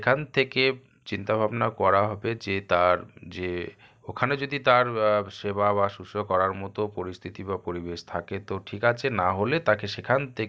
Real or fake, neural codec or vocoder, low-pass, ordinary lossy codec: real; none; none; none